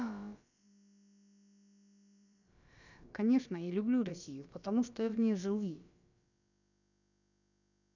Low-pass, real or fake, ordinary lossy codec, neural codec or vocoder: 7.2 kHz; fake; none; codec, 16 kHz, about 1 kbps, DyCAST, with the encoder's durations